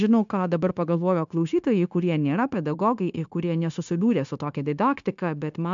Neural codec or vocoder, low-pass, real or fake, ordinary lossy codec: codec, 16 kHz, 0.9 kbps, LongCat-Audio-Codec; 7.2 kHz; fake; MP3, 64 kbps